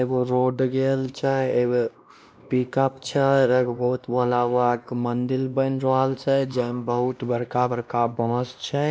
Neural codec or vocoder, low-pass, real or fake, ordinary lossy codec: codec, 16 kHz, 1 kbps, X-Codec, WavLM features, trained on Multilingual LibriSpeech; none; fake; none